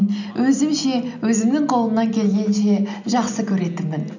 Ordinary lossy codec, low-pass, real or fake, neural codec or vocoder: none; 7.2 kHz; real; none